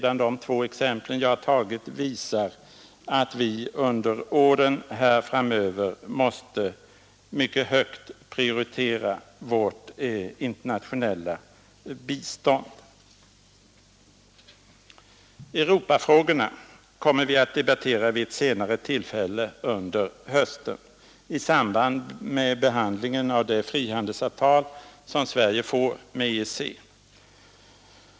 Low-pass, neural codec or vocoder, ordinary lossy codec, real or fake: none; none; none; real